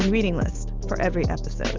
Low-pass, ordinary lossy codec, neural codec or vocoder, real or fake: 7.2 kHz; Opus, 24 kbps; none; real